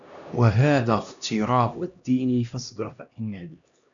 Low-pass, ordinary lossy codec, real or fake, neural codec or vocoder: 7.2 kHz; MP3, 96 kbps; fake; codec, 16 kHz, 1 kbps, X-Codec, HuBERT features, trained on LibriSpeech